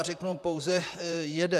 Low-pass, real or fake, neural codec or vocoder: 14.4 kHz; fake; vocoder, 44.1 kHz, 128 mel bands, Pupu-Vocoder